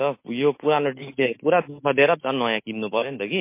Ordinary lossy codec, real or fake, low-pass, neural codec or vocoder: MP3, 24 kbps; fake; 3.6 kHz; autoencoder, 48 kHz, 128 numbers a frame, DAC-VAE, trained on Japanese speech